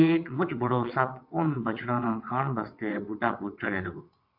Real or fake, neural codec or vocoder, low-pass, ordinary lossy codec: fake; vocoder, 22.05 kHz, 80 mel bands, WaveNeXt; 5.4 kHz; AAC, 48 kbps